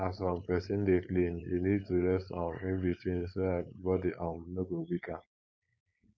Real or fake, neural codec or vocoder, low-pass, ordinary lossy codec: fake; codec, 16 kHz, 4.8 kbps, FACodec; none; none